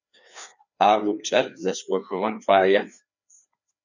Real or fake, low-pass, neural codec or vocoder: fake; 7.2 kHz; codec, 16 kHz, 2 kbps, FreqCodec, larger model